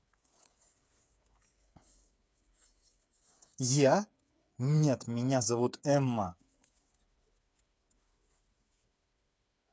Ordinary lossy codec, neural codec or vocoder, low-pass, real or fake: none; codec, 16 kHz, 8 kbps, FreqCodec, smaller model; none; fake